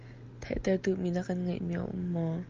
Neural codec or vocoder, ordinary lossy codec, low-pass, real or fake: none; Opus, 24 kbps; 7.2 kHz; real